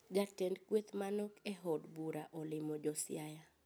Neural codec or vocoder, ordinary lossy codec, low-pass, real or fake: none; none; none; real